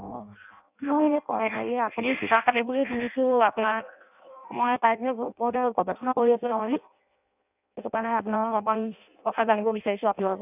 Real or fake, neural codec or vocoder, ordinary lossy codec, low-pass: fake; codec, 16 kHz in and 24 kHz out, 0.6 kbps, FireRedTTS-2 codec; none; 3.6 kHz